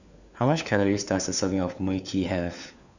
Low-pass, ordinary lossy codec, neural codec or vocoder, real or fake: 7.2 kHz; none; codec, 16 kHz, 4 kbps, FunCodec, trained on LibriTTS, 50 frames a second; fake